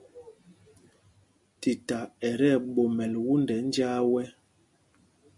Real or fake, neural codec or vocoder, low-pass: real; none; 10.8 kHz